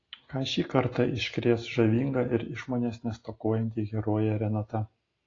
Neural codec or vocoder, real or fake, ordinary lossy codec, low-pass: none; real; AAC, 32 kbps; 7.2 kHz